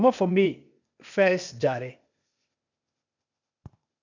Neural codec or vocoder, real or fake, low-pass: codec, 16 kHz, 0.8 kbps, ZipCodec; fake; 7.2 kHz